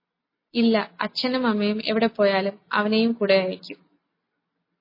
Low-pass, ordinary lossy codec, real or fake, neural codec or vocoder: 5.4 kHz; MP3, 24 kbps; real; none